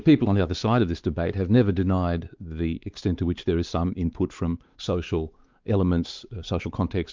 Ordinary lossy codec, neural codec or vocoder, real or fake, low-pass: Opus, 24 kbps; codec, 16 kHz, 4 kbps, X-Codec, WavLM features, trained on Multilingual LibriSpeech; fake; 7.2 kHz